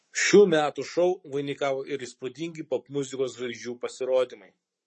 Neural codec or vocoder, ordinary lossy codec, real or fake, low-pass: codec, 44.1 kHz, 7.8 kbps, Pupu-Codec; MP3, 32 kbps; fake; 10.8 kHz